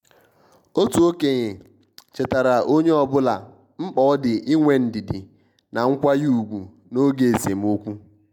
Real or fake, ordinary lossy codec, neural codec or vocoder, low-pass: real; MP3, 96 kbps; none; 19.8 kHz